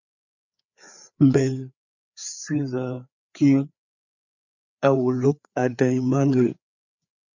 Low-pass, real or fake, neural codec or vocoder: 7.2 kHz; fake; codec, 16 kHz, 4 kbps, FreqCodec, larger model